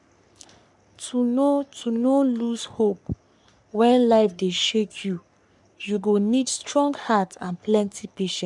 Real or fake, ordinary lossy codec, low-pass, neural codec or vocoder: fake; none; 10.8 kHz; codec, 44.1 kHz, 3.4 kbps, Pupu-Codec